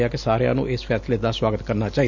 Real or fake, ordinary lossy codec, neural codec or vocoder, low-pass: real; none; none; 7.2 kHz